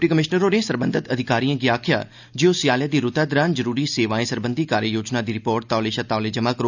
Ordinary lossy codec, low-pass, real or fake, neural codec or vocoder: none; 7.2 kHz; real; none